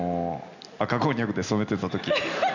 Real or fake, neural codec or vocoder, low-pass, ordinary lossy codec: real; none; 7.2 kHz; none